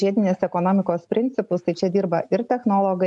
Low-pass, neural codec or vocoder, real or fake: 10.8 kHz; none; real